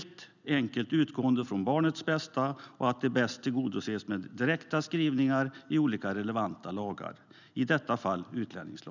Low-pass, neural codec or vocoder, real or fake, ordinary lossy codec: 7.2 kHz; none; real; none